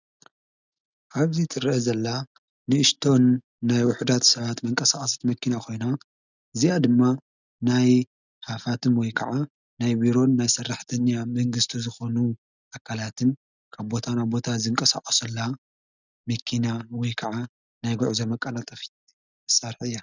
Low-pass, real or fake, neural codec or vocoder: 7.2 kHz; real; none